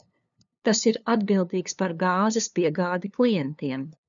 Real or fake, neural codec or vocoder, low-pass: fake; codec, 16 kHz, 2 kbps, FunCodec, trained on LibriTTS, 25 frames a second; 7.2 kHz